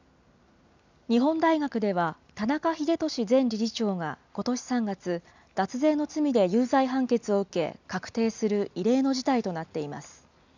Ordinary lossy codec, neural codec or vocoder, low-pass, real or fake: none; none; 7.2 kHz; real